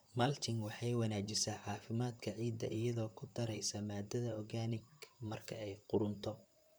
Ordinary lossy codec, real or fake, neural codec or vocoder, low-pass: none; fake; vocoder, 44.1 kHz, 128 mel bands, Pupu-Vocoder; none